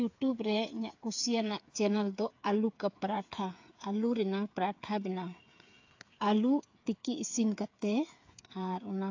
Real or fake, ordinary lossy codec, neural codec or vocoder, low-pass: fake; AAC, 48 kbps; codec, 16 kHz, 8 kbps, FreqCodec, smaller model; 7.2 kHz